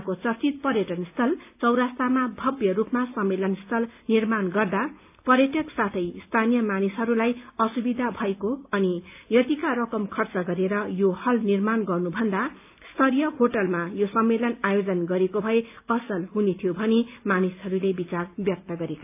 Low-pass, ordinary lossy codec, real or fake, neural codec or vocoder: 3.6 kHz; AAC, 32 kbps; real; none